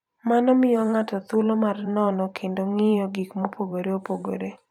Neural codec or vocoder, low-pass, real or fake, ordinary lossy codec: vocoder, 44.1 kHz, 128 mel bands every 512 samples, BigVGAN v2; 19.8 kHz; fake; none